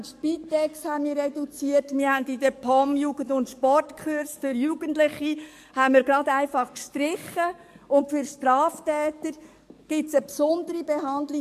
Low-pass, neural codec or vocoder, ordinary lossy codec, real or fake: 14.4 kHz; codec, 44.1 kHz, 7.8 kbps, DAC; MP3, 64 kbps; fake